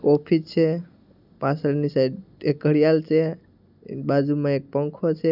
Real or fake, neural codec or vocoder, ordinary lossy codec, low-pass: real; none; none; 5.4 kHz